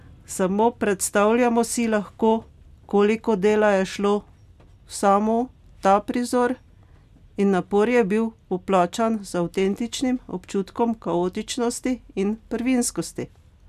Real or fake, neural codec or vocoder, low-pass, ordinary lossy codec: real; none; 14.4 kHz; none